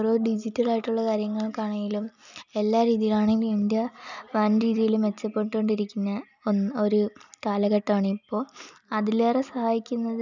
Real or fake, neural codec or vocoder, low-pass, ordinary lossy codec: real; none; 7.2 kHz; none